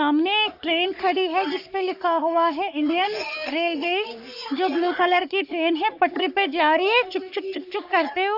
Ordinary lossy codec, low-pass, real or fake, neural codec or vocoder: none; 5.4 kHz; fake; codec, 44.1 kHz, 3.4 kbps, Pupu-Codec